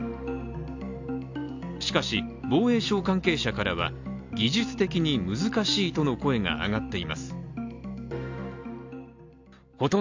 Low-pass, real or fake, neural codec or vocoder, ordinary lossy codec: 7.2 kHz; real; none; AAC, 48 kbps